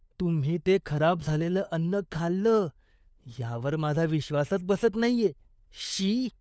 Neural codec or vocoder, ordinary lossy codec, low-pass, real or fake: codec, 16 kHz, 4 kbps, FunCodec, trained on LibriTTS, 50 frames a second; none; none; fake